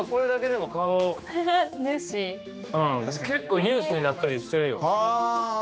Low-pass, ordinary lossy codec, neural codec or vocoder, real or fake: none; none; codec, 16 kHz, 2 kbps, X-Codec, HuBERT features, trained on general audio; fake